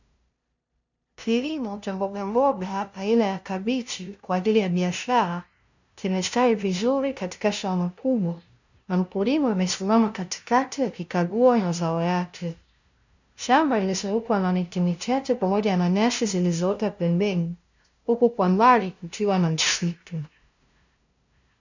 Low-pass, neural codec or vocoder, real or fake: 7.2 kHz; codec, 16 kHz, 0.5 kbps, FunCodec, trained on LibriTTS, 25 frames a second; fake